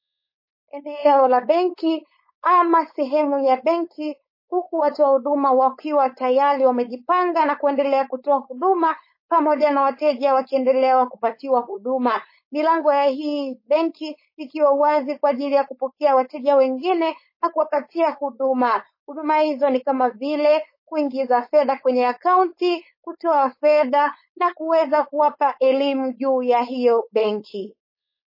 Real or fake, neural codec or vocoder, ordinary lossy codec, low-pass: fake; codec, 16 kHz, 4.8 kbps, FACodec; MP3, 24 kbps; 5.4 kHz